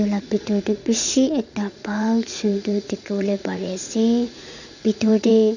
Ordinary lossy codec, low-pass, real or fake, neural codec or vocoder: none; 7.2 kHz; fake; vocoder, 44.1 kHz, 80 mel bands, Vocos